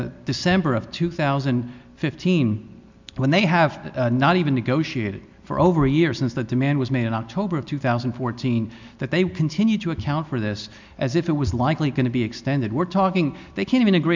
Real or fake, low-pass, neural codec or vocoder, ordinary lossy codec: real; 7.2 kHz; none; MP3, 64 kbps